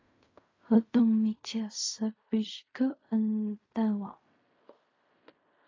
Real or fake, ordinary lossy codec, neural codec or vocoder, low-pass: fake; AAC, 32 kbps; codec, 16 kHz in and 24 kHz out, 0.4 kbps, LongCat-Audio-Codec, fine tuned four codebook decoder; 7.2 kHz